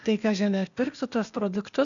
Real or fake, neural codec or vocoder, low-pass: fake; codec, 16 kHz, 0.8 kbps, ZipCodec; 7.2 kHz